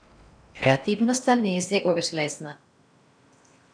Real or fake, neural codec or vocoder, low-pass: fake; codec, 16 kHz in and 24 kHz out, 0.6 kbps, FocalCodec, streaming, 4096 codes; 9.9 kHz